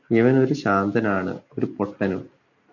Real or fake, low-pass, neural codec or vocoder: real; 7.2 kHz; none